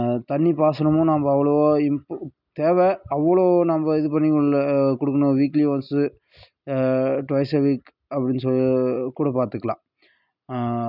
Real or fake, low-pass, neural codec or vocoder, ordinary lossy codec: real; 5.4 kHz; none; none